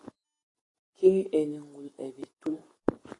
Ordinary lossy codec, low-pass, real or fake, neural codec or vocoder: AAC, 32 kbps; 10.8 kHz; real; none